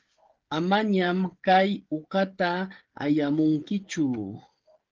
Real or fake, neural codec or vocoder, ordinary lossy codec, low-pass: fake; codec, 16 kHz, 16 kbps, FreqCodec, smaller model; Opus, 16 kbps; 7.2 kHz